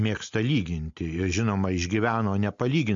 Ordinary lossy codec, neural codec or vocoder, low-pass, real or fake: MP3, 48 kbps; none; 7.2 kHz; real